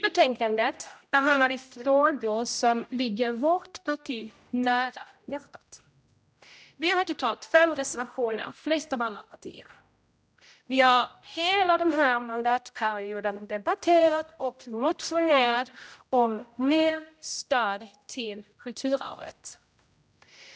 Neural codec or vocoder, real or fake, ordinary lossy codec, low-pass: codec, 16 kHz, 0.5 kbps, X-Codec, HuBERT features, trained on general audio; fake; none; none